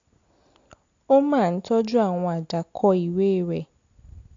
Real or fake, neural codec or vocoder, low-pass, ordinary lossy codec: real; none; 7.2 kHz; AAC, 48 kbps